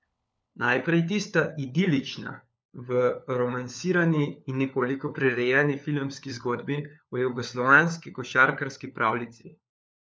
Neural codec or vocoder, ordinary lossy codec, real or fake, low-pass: codec, 16 kHz, 4 kbps, FunCodec, trained on LibriTTS, 50 frames a second; none; fake; none